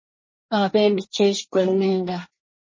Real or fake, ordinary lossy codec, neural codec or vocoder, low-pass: fake; MP3, 32 kbps; codec, 24 kHz, 1 kbps, SNAC; 7.2 kHz